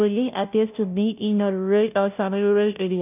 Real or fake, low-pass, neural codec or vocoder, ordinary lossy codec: fake; 3.6 kHz; codec, 16 kHz, 0.5 kbps, FunCodec, trained on Chinese and English, 25 frames a second; none